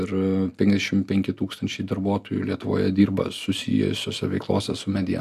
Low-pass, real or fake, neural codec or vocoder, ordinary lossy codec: 14.4 kHz; real; none; AAC, 96 kbps